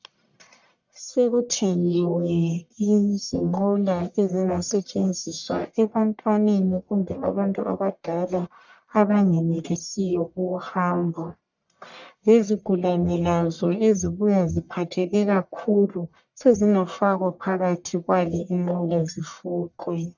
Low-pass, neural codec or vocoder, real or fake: 7.2 kHz; codec, 44.1 kHz, 1.7 kbps, Pupu-Codec; fake